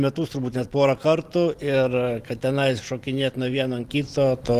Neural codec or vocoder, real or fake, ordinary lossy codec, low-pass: vocoder, 44.1 kHz, 128 mel bands every 512 samples, BigVGAN v2; fake; Opus, 24 kbps; 14.4 kHz